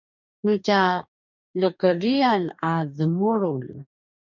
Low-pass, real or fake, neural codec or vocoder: 7.2 kHz; fake; codec, 44.1 kHz, 2.6 kbps, DAC